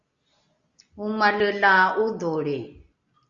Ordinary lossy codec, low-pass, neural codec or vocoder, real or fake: Opus, 64 kbps; 7.2 kHz; none; real